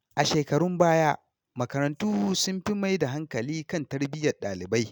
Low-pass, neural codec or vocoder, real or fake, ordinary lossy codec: none; none; real; none